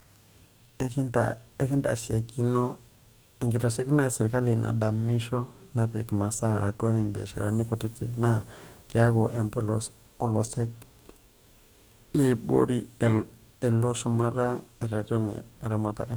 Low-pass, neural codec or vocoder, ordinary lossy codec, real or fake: none; codec, 44.1 kHz, 2.6 kbps, DAC; none; fake